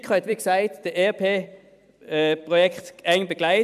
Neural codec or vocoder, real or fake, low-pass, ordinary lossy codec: vocoder, 44.1 kHz, 128 mel bands every 256 samples, BigVGAN v2; fake; 14.4 kHz; none